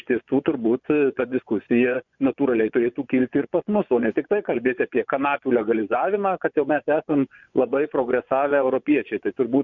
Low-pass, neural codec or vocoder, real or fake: 7.2 kHz; vocoder, 24 kHz, 100 mel bands, Vocos; fake